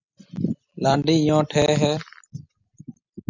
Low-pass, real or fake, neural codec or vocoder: 7.2 kHz; real; none